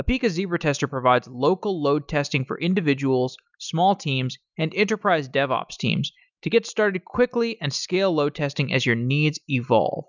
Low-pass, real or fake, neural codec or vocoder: 7.2 kHz; real; none